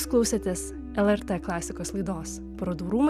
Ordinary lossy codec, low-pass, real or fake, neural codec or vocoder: Opus, 64 kbps; 14.4 kHz; real; none